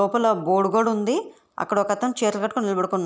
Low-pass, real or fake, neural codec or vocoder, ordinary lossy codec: none; real; none; none